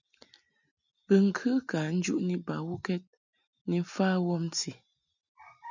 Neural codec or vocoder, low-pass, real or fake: none; 7.2 kHz; real